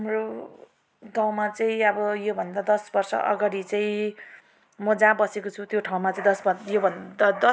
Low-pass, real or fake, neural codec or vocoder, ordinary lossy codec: none; real; none; none